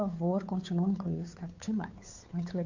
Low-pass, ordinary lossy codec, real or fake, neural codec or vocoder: 7.2 kHz; AAC, 48 kbps; fake; codec, 16 kHz, 2 kbps, FunCodec, trained on Chinese and English, 25 frames a second